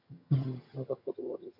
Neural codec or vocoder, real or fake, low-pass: vocoder, 22.05 kHz, 80 mel bands, HiFi-GAN; fake; 5.4 kHz